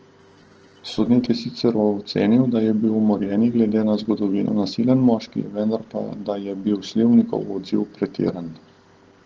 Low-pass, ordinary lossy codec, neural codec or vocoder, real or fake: 7.2 kHz; Opus, 16 kbps; none; real